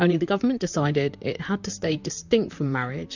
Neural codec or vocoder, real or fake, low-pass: vocoder, 44.1 kHz, 128 mel bands, Pupu-Vocoder; fake; 7.2 kHz